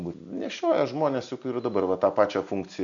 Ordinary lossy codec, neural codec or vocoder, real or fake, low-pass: MP3, 96 kbps; none; real; 7.2 kHz